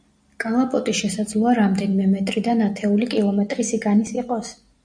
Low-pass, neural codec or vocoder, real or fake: 9.9 kHz; none; real